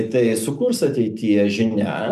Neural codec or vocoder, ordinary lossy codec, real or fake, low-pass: none; MP3, 96 kbps; real; 14.4 kHz